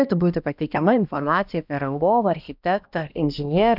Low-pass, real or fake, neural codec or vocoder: 5.4 kHz; fake; codec, 16 kHz, 1 kbps, X-Codec, HuBERT features, trained on balanced general audio